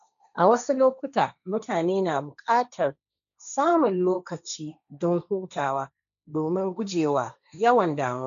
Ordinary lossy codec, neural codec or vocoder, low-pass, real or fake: none; codec, 16 kHz, 1.1 kbps, Voila-Tokenizer; 7.2 kHz; fake